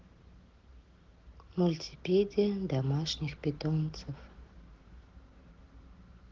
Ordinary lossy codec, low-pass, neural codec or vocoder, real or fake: Opus, 16 kbps; 7.2 kHz; none; real